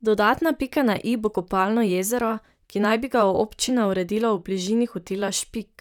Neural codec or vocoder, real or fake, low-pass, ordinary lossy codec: vocoder, 44.1 kHz, 128 mel bands, Pupu-Vocoder; fake; 19.8 kHz; none